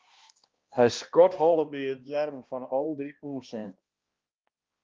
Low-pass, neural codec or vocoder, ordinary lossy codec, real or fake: 7.2 kHz; codec, 16 kHz, 1 kbps, X-Codec, HuBERT features, trained on balanced general audio; Opus, 24 kbps; fake